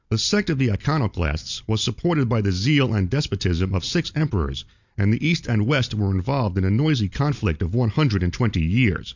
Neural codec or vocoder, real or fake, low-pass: none; real; 7.2 kHz